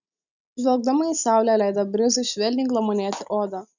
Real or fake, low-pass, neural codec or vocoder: real; 7.2 kHz; none